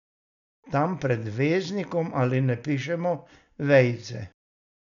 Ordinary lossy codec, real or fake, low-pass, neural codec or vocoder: none; real; 7.2 kHz; none